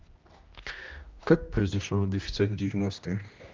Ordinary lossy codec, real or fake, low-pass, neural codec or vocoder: Opus, 32 kbps; fake; 7.2 kHz; codec, 16 kHz, 1 kbps, X-Codec, HuBERT features, trained on general audio